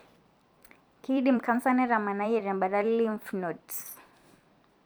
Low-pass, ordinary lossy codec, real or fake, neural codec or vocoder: none; none; fake; vocoder, 44.1 kHz, 128 mel bands every 256 samples, BigVGAN v2